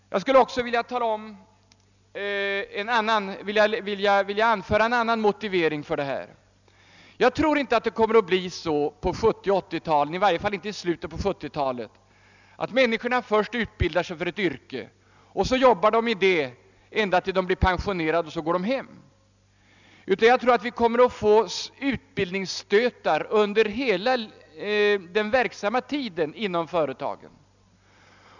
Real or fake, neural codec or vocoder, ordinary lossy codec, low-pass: real; none; none; 7.2 kHz